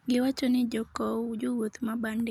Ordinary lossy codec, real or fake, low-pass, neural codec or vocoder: none; real; 19.8 kHz; none